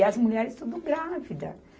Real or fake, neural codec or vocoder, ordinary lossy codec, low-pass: real; none; none; none